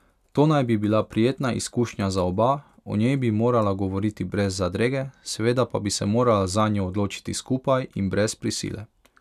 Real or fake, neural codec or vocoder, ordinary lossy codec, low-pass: real; none; none; 14.4 kHz